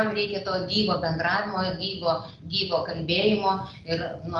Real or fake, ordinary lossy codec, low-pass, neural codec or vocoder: real; Opus, 32 kbps; 10.8 kHz; none